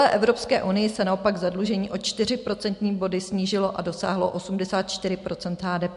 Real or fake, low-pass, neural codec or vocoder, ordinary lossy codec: real; 10.8 kHz; none; MP3, 64 kbps